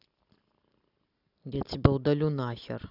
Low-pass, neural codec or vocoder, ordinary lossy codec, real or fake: 5.4 kHz; none; none; real